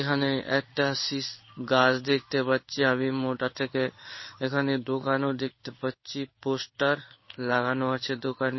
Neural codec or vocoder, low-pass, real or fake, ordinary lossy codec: codec, 16 kHz in and 24 kHz out, 1 kbps, XY-Tokenizer; 7.2 kHz; fake; MP3, 24 kbps